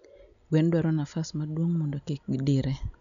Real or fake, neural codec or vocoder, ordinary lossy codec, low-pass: real; none; none; 7.2 kHz